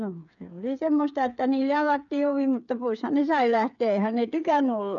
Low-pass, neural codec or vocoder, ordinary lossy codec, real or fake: 7.2 kHz; codec, 16 kHz, 8 kbps, FreqCodec, smaller model; none; fake